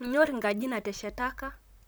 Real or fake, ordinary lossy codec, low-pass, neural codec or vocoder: fake; none; none; vocoder, 44.1 kHz, 128 mel bands, Pupu-Vocoder